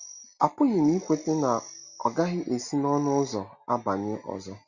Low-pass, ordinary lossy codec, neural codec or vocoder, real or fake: 7.2 kHz; Opus, 64 kbps; none; real